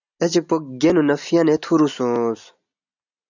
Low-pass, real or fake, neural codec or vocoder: 7.2 kHz; real; none